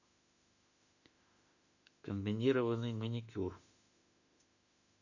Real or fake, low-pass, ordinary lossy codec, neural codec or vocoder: fake; 7.2 kHz; none; autoencoder, 48 kHz, 32 numbers a frame, DAC-VAE, trained on Japanese speech